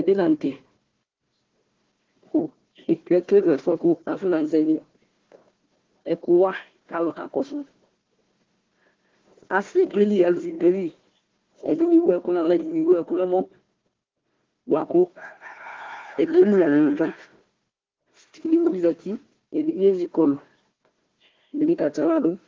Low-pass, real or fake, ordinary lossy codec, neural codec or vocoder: 7.2 kHz; fake; Opus, 16 kbps; codec, 16 kHz, 1 kbps, FunCodec, trained on Chinese and English, 50 frames a second